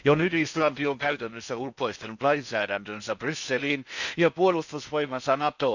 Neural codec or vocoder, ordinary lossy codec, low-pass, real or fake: codec, 16 kHz in and 24 kHz out, 0.8 kbps, FocalCodec, streaming, 65536 codes; none; 7.2 kHz; fake